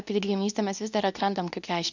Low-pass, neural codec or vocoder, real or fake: 7.2 kHz; codec, 24 kHz, 0.9 kbps, WavTokenizer, medium speech release version 2; fake